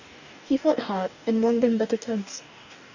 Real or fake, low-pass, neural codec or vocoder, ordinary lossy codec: fake; 7.2 kHz; codec, 44.1 kHz, 2.6 kbps, DAC; none